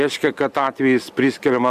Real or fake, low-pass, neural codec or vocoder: real; 14.4 kHz; none